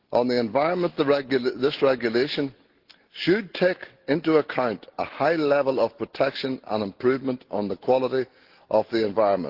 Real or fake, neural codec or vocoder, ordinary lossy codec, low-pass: real; none; Opus, 16 kbps; 5.4 kHz